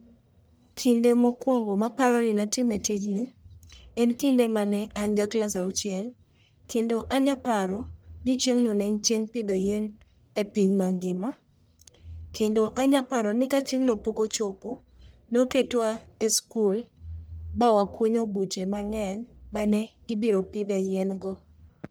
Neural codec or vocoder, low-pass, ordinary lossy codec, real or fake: codec, 44.1 kHz, 1.7 kbps, Pupu-Codec; none; none; fake